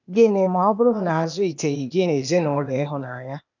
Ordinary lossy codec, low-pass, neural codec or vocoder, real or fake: none; 7.2 kHz; codec, 16 kHz, 0.8 kbps, ZipCodec; fake